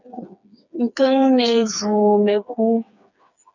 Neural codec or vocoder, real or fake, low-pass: codec, 16 kHz, 4 kbps, FreqCodec, smaller model; fake; 7.2 kHz